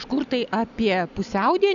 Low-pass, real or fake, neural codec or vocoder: 7.2 kHz; real; none